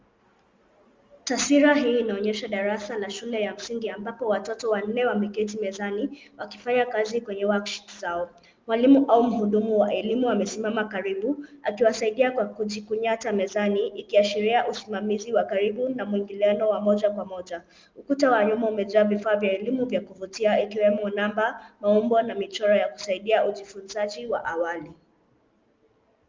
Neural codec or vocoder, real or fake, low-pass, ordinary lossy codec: none; real; 7.2 kHz; Opus, 32 kbps